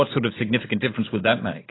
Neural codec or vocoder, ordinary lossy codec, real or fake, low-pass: none; AAC, 16 kbps; real; 7.2 kHz